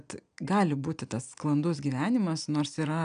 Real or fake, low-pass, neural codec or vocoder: real; 9.9 kHz; none